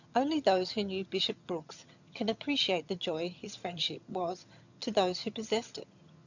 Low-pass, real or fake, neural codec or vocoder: 7.2 kHz; fake; vocoder, 22.05 kHz, 80 mel bands, HiFi-GAN